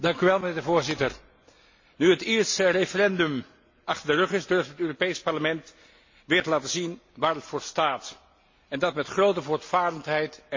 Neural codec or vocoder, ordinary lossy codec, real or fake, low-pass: none; MP3, 32 kbps; real; 7.2 kHz